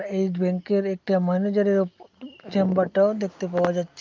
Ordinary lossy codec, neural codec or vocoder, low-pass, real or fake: Opus, 24 kbps; none; 7.2 kHz; real